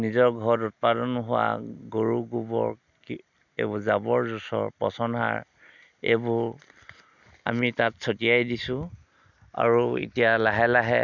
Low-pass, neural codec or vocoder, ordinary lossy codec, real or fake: 7.2 kHz; none; none; real